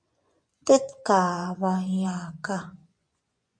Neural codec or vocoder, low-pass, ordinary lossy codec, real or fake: vocoder, 24 kHz, 100 mel bands, Vocos; 9.9 kHz; MP3, 48 kbps; fake